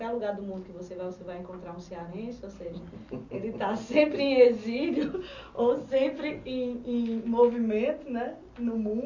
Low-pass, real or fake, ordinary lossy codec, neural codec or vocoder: 7.2 kHz; real; none; none